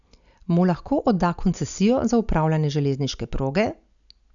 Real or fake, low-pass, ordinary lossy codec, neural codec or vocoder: real; 7.2 kHz; none; none